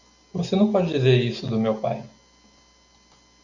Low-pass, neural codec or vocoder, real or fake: 7.2 kHz; none; real